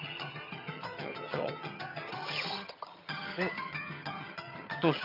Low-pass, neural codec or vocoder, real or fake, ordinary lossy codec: 5.4 kHz; vocoder, 22.05 kHz, 80 mel bands, HiFi-GAN; fake; none